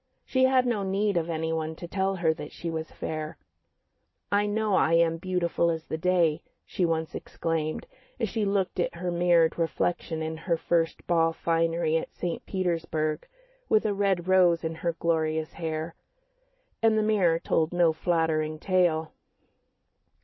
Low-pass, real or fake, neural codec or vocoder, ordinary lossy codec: 7.2 kHz; real; none; MP3, 24 kbps